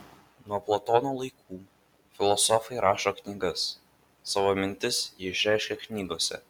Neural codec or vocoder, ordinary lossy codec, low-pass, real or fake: none; MP3, 96 kbps; 19.8 kHz; real